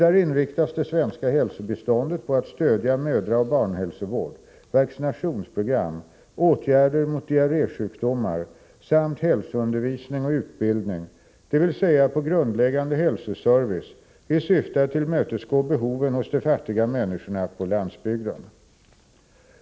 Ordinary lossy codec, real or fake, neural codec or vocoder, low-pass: none; real; none; none